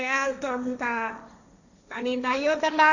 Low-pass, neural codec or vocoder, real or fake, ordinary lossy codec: 7.2 kHz; codec, 16 kHz, 1.1 kbps, Voila-Tokenizer; fake; none